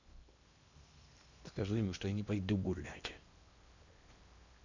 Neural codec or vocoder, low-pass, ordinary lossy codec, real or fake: codec, 16 kHz in and 24 kHz out, 0.8 kbps, FocalCodec, streaming, 65536 codes; 7.2 kHz; Opus, 64 kbps; fake